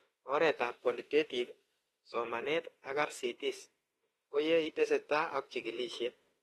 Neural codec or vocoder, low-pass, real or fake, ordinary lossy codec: autoencoder, 48 kHz, 32 numbers a frame, DAC-VAE, trained on Japanese speech; 19.8 kHz; fake; AAC, 32 kbps